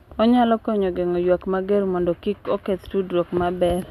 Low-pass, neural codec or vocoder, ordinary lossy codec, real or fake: 14.4 kHz; none; none; real